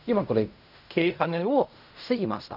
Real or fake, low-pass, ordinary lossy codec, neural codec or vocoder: fake; 5.4 kHz; none; codec, 16 kHz in and 24 kHz out, 0.4 kbps, LongCat-Audio-Codec, fine tuned four codebook decoder